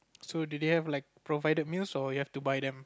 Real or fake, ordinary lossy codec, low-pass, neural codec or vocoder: real; none; none; none